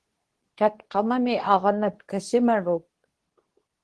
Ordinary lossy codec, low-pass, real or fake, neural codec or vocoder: Opus, 16 kbps; 10.8 kHz; fake; codec, 24 kHz, 0.9 kbps, WavTokenizer, medium speech release version 2